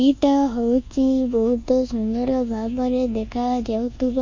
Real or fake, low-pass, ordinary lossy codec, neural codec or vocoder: fake; 7.2 kHz; MP3, 48 kbps; codec, 24 kHz, 1.2 kbps, DualCodec